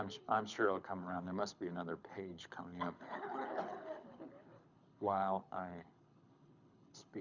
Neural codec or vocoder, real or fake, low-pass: codec, 24 kHz, 6 kbps, HILCodec; fake; 7.2 kHz